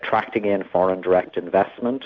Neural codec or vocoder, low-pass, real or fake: none; 7.2 kHz; real